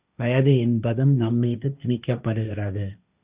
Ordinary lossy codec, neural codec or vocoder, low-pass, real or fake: Opus, 64 kbps; codec, 16 kHz, 1.1 kbps, Voila-Tokenizer; 3.6 kHz; fake